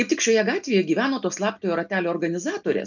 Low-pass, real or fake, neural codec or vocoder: 7.2 kHz; real; none